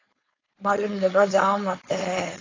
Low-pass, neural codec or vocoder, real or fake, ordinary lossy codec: 7.2 kHz; codec, 16 kHz, 4.8 kbps, FACodec; fake; AAC, 32 kbps